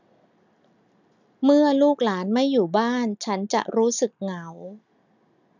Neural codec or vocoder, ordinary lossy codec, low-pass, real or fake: none; none; 7.2 kHz; real